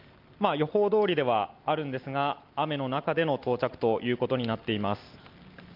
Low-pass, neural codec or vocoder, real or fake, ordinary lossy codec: 5.4 kHz; none; real; Opus, 32 kbps